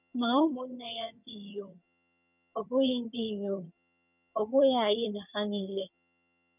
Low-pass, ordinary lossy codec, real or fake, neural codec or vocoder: 3.6 kHz; none; fake; vocoder, 22.05 kHz, 80 mel bands, HiFi-GAN